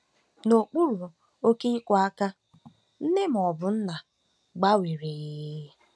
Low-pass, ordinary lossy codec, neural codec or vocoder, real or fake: none; none; none; real